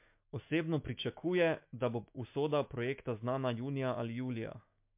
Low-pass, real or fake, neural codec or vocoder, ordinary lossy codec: 3.6 kHz; fake; autoencoder, 48 kHz, 128 numbers a frame, DAC-VAE, trained on Japanese speech; MP3, 32 kbps